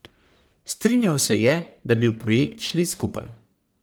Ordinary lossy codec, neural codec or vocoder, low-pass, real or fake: none; codec, 44.1 kHz, 1.7 kbps, Pupu-Codec; none; fake